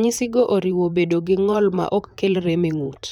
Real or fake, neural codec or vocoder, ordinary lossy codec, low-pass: fake; vocoder, 44.1 kHz, 128 mel bands, Pupu-Vocoder; none; 19.8 kHz